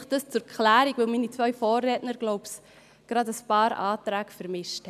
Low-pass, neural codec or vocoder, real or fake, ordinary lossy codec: 14.4 kHz; none; real; none